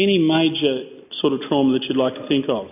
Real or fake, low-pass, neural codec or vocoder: real; 3.6 kHz; none